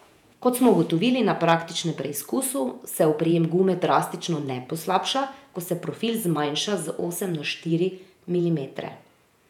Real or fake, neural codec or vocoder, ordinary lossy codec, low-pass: fake; vocoder, 48 kHz, 128 mel bands, Vocos; none; 19.8 kHz